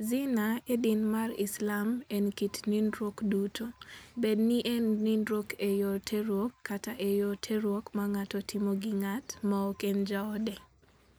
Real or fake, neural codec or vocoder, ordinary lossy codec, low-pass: real; none; none; none